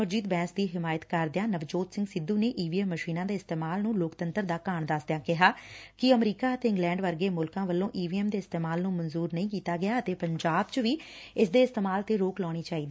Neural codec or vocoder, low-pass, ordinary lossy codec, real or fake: none; none; none; real